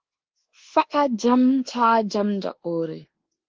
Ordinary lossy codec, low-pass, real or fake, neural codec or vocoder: Opus, 16 kbps; 7.2 kHz; fake; codec, 24 kHz, 1.2 kbps, DualCodec